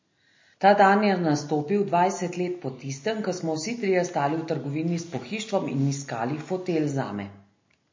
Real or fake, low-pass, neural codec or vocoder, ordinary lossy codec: real; 7.2 kHz; none; MP3, 32 kbps